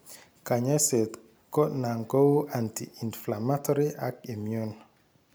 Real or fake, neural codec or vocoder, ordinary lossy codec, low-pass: real; none; none; none